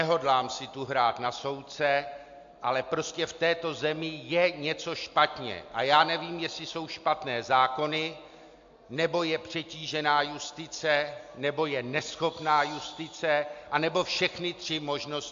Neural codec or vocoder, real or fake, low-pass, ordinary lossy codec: none; real; 7.2 kHz; AAC, 64 kbps